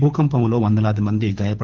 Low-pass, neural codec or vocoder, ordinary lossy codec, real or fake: 7.2 kHz; codec, 24 kHz, 6 kbps, HILCodec; Opus, 16 kbps; fake